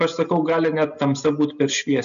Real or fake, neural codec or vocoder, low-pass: real; none; 7.2 kHz